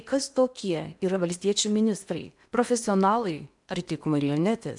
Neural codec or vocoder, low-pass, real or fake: codec, 16 kHz in and 24 kHz out, 0.8 kbps, FocalCodec, streaming, 65536 codes; 10.8 kHz; fake